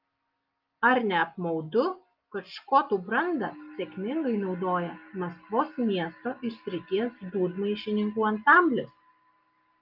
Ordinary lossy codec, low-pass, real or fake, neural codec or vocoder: Opus, 24 kbps; 5.4 kHz; real; none